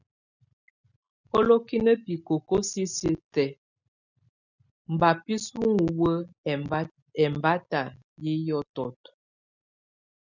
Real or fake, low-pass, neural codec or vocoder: real; 7.2 kHz; none